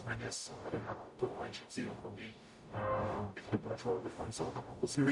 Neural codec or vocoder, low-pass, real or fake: codec, 44.1 kHz, 0.9 kbps, DAC; 10.8 kHz; fake